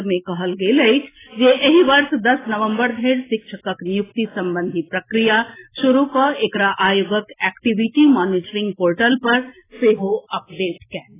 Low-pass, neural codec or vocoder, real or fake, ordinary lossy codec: 3.6 kHz; none; real; AAC, 16 kbps